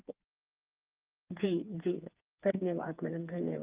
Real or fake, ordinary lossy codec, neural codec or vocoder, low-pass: fake; Opus, 32 kbps; codec, 16 kHz, 4 kbps, FreqCodec, smaller model; 3.6 kHz